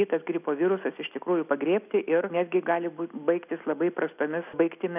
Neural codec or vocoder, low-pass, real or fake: none; 3.6 kHz; real